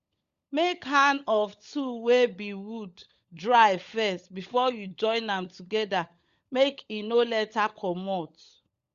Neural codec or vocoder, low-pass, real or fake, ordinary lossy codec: codec, 16 kHz, 16 kbps, FunCodec, trained on LibriTTS, 50 frames a second; 7.2 kHz; fake; Opus, 64 kbps